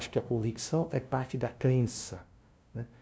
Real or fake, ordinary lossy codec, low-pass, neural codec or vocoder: fake; none; none; codec, 16 kHz, 0.5 kbps, FunCodec, trained on LibriTTS, 25 frames a second